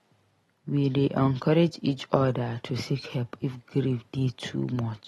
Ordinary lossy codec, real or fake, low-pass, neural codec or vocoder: AAC, 32 kbps; real; 19.8 kHz; none